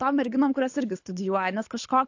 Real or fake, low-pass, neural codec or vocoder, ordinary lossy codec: fake; 7.2 kHz; codec, 24 kHz, 6 kbps, HILCodec; AAC, 48 kbps